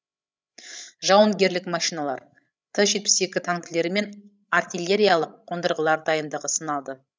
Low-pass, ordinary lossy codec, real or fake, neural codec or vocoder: none; none; fake; codec, 16 kHz, 16 kbps, FreqCodec, larger model